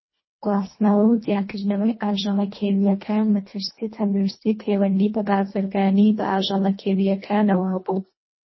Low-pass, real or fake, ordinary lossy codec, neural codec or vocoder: 7.2 kHz; fake; MP3, 24 kbps; codec, 24 kHz, 1.5 kbps, HILCodec